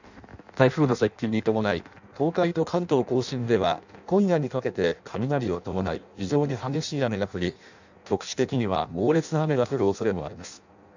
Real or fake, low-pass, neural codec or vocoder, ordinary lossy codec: fake; 7.2 kHz; codec, 16 kHz in and 24 kHz out, 0.6 kbps, FireRedTTS-2 codec; none